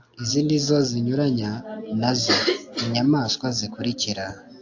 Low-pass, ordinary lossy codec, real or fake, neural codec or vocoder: 7.2 kHz; AAC, 48 kbps; real; none